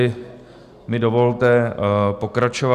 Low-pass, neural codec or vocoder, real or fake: 14.4 kHz; none; real